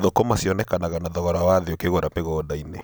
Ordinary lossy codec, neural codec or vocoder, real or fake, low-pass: none; none; real; none